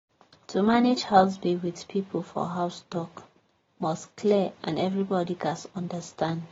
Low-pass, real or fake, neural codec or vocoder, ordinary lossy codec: 7.2 kHz; real; none; AAC, 24 kbps